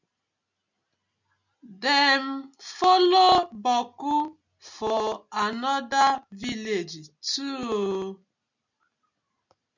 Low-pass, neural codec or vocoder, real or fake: 7.2 kHz; none; real